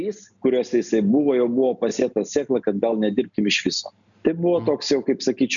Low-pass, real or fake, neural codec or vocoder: 7.2 kHz; real; none